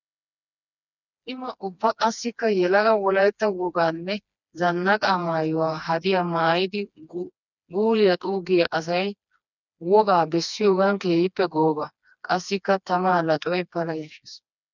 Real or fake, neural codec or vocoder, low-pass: fake; codec, 16 kHz, 2 kbps, FreqCodec, smaller model; 7.2 kHz